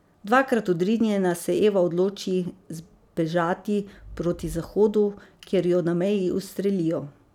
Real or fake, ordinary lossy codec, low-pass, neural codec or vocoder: real; none; 19.8 kHz; none